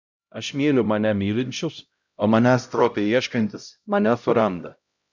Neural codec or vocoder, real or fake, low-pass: codec, 16 kHz, 0.5 kbps, X-Codec, HuBERT features, trained on LibriSpeech; fake; 7.2 kHz